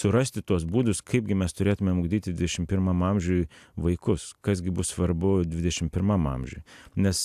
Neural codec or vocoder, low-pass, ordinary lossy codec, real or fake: none; 14.4 kHz; AAC, 96 kbps; real